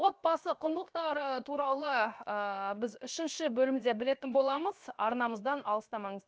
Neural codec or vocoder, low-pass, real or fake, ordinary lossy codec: codec, 16 kHz, 0.7 kbps, FocalCodec; none; fake; none